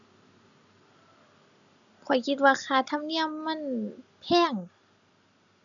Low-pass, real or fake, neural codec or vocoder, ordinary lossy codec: 7.2 kHz; real; none; none